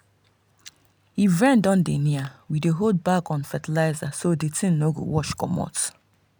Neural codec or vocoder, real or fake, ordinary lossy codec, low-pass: none; real; none; none